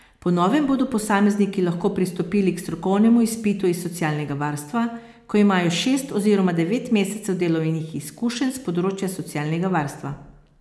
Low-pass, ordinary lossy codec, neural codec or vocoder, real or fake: none; none; none; real